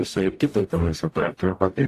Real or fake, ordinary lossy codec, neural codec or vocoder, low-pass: fake; AAC, 64 kbps; codec, 44.1 kHz, 0.9 kbps, DAC; 14.4 kHz